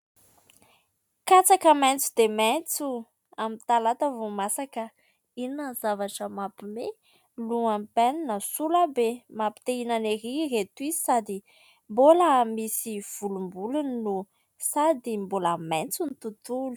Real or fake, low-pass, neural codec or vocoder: real; 19.8 kHz; none